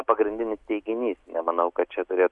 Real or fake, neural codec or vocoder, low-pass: real; none; 10.8 kHz